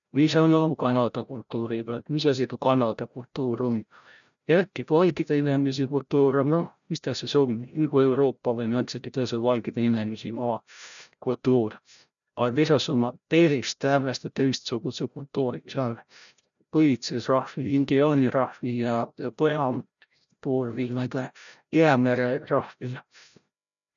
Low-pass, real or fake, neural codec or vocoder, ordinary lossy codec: 7.2 kHz; fake; codec, 16 kHz, 0.5 kbps, FreqCodec, larger model; none